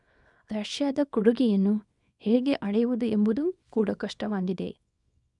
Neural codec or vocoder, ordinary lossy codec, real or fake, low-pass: codec, 24 kHz, 0.9 kbps, WavTokenizer, small release; none; fake; 10.8 kHz